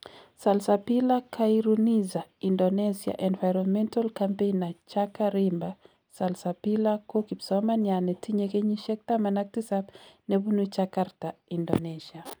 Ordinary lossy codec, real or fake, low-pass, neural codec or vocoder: none; real; none; none